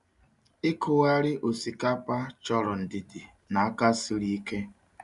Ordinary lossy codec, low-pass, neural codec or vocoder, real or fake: none; 10.8 kHz; none; real